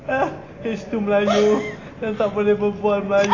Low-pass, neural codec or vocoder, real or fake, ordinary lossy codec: 7.2 kHz; none; real; AAC, 32 kbps